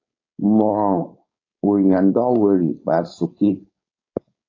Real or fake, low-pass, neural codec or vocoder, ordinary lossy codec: fake; 7.2 kHz; codec, 16 kHz, 4.8 kbps, FACodec; AAC, 32 kbps